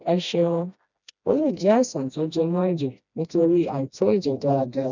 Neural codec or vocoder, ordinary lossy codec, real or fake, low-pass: codec, 16 kHz, 1 kbps, FreqCodec, smaller model; none; fake; 7.2 kHz